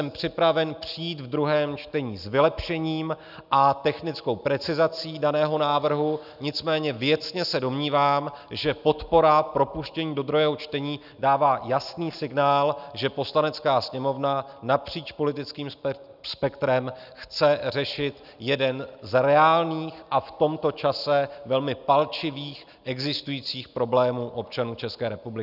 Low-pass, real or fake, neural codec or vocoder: 5.4 kHz; real; none